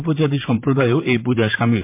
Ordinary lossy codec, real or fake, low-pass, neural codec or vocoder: MP3, 32 kbps; fake; 3.6 kHz; vocoder, 44.1 kHz, 128 mel bands, Pupu-Vocoder